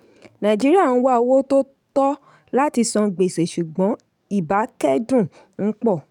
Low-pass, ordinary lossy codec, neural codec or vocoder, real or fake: 19.8 kHz; none; codec, 44.1 kHz, 7.8 kbps, DAC; fake